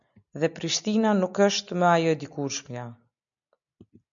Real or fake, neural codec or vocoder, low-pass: real; none; 7.2 kHz